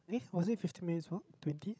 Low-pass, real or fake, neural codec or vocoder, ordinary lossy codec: none; fake; codec, 16 kHz, 16 kbps, FreqCodec, larger model; none